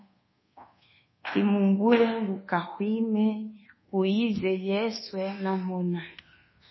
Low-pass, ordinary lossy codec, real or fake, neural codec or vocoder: 7.2 kHz; MP3, 24 kbps; fake; codec, 24 kHz, 1.2 kbps, DualCodec